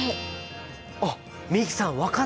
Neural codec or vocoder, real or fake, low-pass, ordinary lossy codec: none; real; none; none